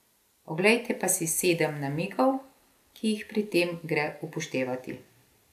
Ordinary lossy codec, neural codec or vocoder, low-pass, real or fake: none; none; 14.4 kHz; real